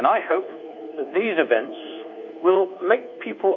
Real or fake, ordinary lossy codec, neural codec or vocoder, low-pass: fake; AAC, 48 kbps; codec, 24 kHz, 1.2 kbps, DualCodec; 7.2 kHz